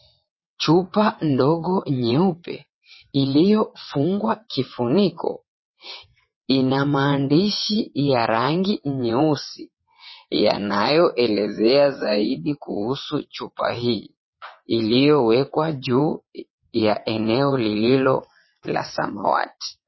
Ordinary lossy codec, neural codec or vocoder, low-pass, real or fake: MP3, 24 kbps; vocoder, 22.05 kHz, 80 mel bands, WaveNeXt; 7.2 kHz; fake